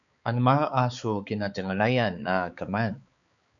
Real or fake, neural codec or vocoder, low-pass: fake; codec, 16 kHz, 4 kbps, X-Codec, HuBERT features, trained on balanced general audio; 7.2 kHz